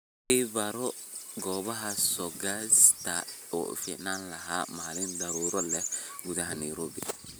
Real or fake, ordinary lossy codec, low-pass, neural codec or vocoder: real; none; none; none